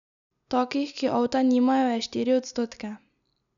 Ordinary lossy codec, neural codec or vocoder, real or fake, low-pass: none; none; real; 7.2 kHz